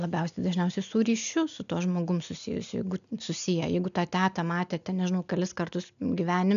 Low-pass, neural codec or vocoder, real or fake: 7.2 kHz; none; real